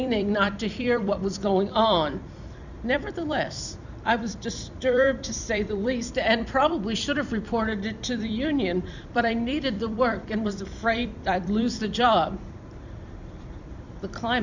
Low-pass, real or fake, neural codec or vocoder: 7.2 kHz; fake; vocoder, 44.1 kHz, 128 mel bands every 256 samples, BigVGAN v2